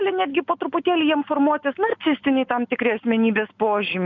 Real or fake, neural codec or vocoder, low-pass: real; none; 7.2 kHz